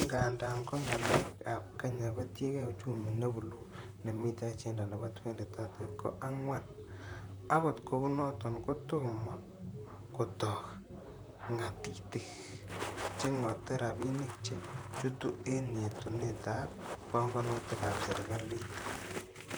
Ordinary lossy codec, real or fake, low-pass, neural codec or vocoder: none; fake; none; vocoder, 44.1 kHz, 128 mel bands, Pupu-Vocoder